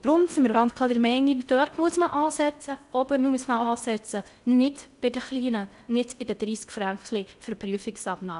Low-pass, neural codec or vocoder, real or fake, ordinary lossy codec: 10.8 kHz; codec, 16 kHz in and 24 kHz out, 0.6 kbps, FocalCodec, streaming, 4096 codes; fake; none